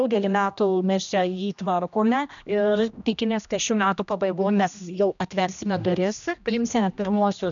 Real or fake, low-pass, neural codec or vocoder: fake; 7.2 kHz; codec, 16 kHz, 1 kbps, X-Codec, HuBERT features, trained on general audio